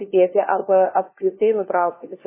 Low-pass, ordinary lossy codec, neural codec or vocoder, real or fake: 3.6 kHz; MP3, 16 kbps; codec, 16 kHz, 1 kbps, FunCodec, trained on LibriTTS, 50 frames a second; fake